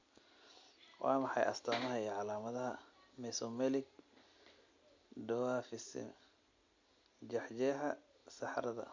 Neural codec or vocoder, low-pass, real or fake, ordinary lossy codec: none; 7.2 kHz; real; MP3, 48 kbps